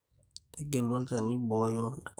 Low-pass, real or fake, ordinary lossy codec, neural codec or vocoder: none; fake; none; codec, 44.1 kHz, 2.6 kbps, SNAC